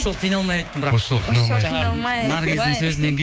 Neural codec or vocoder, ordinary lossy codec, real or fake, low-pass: codec, 16 kHz, 6 kbps, DAC; none; fake; none